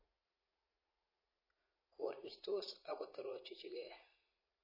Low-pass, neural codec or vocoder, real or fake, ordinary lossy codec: 5.4 kHz; vocoder, 44.1 kHz, 80 mel bands, Vocos; fake; MP3, 24 kbps